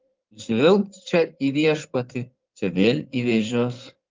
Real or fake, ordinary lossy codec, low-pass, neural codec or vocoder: fake; Opus, 24 kbps; 7.2 kHz; codec, 16 kHz in and 24 kHz out, 2.2 kbps, FireRedTTS-2 codec